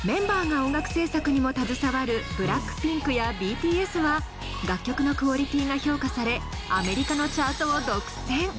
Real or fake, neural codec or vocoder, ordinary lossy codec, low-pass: real; none; none; none